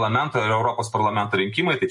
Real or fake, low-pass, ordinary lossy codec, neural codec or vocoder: real; 10.8 kHz; MP3, 48 kbps; none